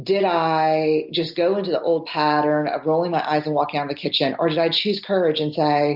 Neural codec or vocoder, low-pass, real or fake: none; 5.4 kHz; real